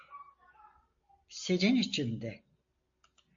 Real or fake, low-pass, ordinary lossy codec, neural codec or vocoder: real; 7.2 kHz; MP3, 48 kbps; none